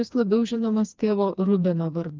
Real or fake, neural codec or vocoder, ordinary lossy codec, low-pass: fake; codec, 16 kHz, 2 kbps, FreqCodec, smaller model; Opus, 32 kbps; 7.2 kHz